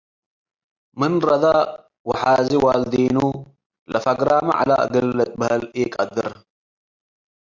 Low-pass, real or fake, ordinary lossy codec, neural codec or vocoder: 7.2 kHz; real; Opus, 64 kbps; none